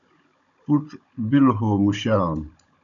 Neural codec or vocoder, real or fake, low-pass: codec, 16 kHz, 16 kbps, FunCodec, trained on Chinese and English, 50 frames a second; fake; 7.2 kHz